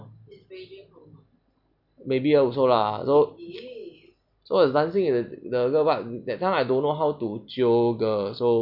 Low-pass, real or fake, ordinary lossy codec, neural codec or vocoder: 5.4 kHz; real; none; none